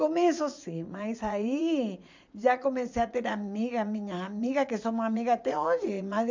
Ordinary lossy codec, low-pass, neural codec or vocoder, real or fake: MP3, 64 kbps; 7.2 kHz; vocoder, 44.1 kHz, 80 mel bands, Vocos; fake